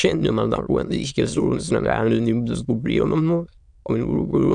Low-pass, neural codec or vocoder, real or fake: 9.9 kHz; autoencoder, 22.05 kHz, a latent of 192 numbers a frame, VITS, trained on many speakers; fake